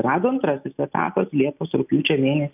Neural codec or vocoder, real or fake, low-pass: none; real; 3.6 kHz